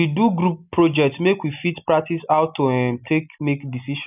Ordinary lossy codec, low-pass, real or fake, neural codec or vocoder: none; 3.6 kHz; real; none